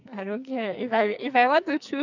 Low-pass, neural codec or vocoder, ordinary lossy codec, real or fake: 7.2 kHz; codec, 44.1 kHz, 2.6 kbps, SNAC; none; fake